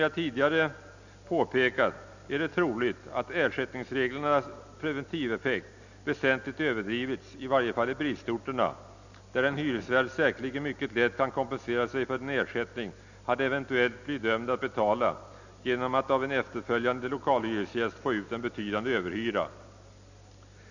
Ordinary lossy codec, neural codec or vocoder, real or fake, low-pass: none; none; real; 7.2 kHz